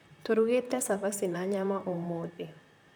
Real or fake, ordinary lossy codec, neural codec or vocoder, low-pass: fake; none; vocoder, 44.1 kHz, 128 mel bands, Pupu-Vocoder; none